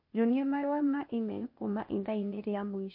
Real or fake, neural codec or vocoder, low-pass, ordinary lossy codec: fake; codec, 16 kHz, 0.8 kbps, ZipCodec; 5.4 kHz; MP3, 32 kbps